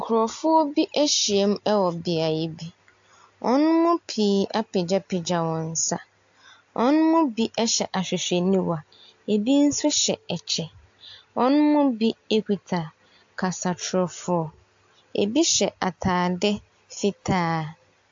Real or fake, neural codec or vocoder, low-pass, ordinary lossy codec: real; none; 7.2 kHz; AAC, 64 kbps